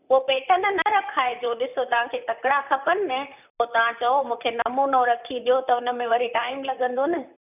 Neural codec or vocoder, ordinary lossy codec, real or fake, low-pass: vocoder, 44.1 kHz, 128 mel bands, Pupu-Vocoder; none; fake; 3.6 kHz